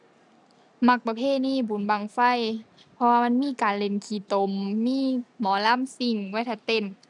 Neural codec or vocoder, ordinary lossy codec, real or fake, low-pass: none; none; real; 10.8 kHz